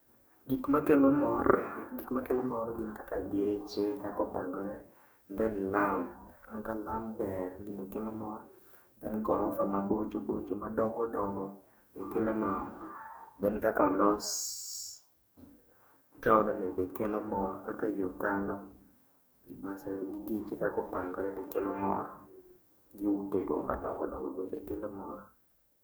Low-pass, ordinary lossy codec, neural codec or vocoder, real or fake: none; none; codec, 44.1 kHz, 2.6 kbps, DAC; fake